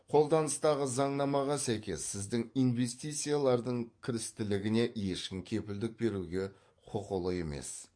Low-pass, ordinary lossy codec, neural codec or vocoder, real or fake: 9.9 kHz; MP3, 48 kbps; codec, 44.1 kHz, 7.8 kbps, DAC; fake